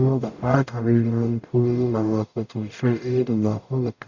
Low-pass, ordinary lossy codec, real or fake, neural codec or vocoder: 7.2 kHz; none; fake; codec, 44.1 kHz, 0.9 kbps, DAC